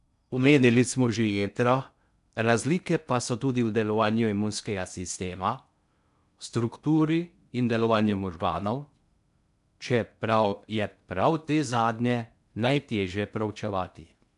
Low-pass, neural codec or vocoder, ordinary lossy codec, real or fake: 10.8 kHz; codec, 16 kHz in and 24 kHz out, 0.6 kbps, FocalCodec, streaming, 2048 codes; none; fake